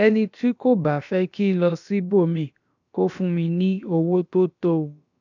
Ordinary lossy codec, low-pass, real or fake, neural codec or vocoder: none; 7.2 kHz; fake; codec, 16 kHz, about 1 kbps, DyCAST, with the encoder's durations